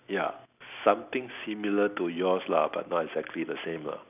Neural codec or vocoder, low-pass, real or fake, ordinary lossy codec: none; 3.6 kHz; real; none